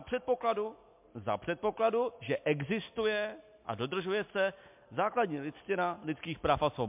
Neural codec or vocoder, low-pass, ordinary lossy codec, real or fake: none; 3.6 kHz; MP3, 32 kbps; real